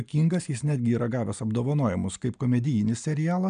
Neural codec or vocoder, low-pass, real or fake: none; 9.9 kHz; real